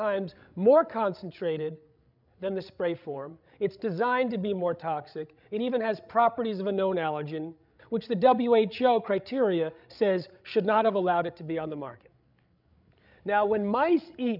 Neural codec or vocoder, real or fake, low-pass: codec, 16 kHz, 16 kbps, FreqCodec, larger model; fake; 5.4 kHz